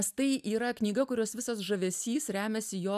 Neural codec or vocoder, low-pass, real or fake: none; 14.4 kHz; real